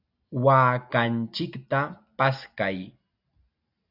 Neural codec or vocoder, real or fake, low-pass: none; real; 5.4 kHz